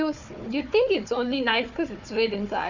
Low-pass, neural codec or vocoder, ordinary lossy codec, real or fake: 7.2 kHz; codec, 16 kHz, 4 kbps, FunCodec, trained on Chinese and English, 50 frames a second; none; fake